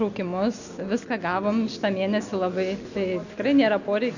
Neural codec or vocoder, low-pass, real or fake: none; 7.2 kHz; real